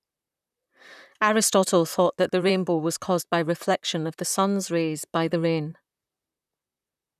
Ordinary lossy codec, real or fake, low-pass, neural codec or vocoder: none; fake; 14.4 kHz; vocoder, 44.1 kHz, 128 mel bands, Pupu-Vocoder